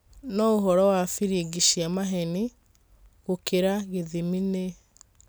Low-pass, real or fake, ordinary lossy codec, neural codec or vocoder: none; real; none; none